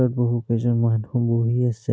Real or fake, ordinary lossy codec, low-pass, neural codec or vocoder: real; none; none; none